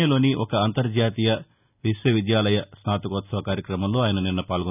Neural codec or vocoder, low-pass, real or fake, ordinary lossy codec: none; 3.6 kHz; real; none